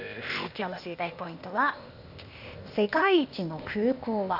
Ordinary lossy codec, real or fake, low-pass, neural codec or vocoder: none; fake; 5.4 kHz; codec, 16 kHz, 0.8 kbps, ZipCodec